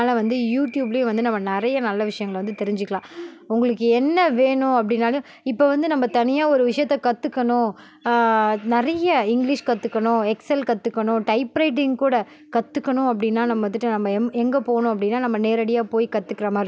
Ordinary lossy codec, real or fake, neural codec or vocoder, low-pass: none; real; none; none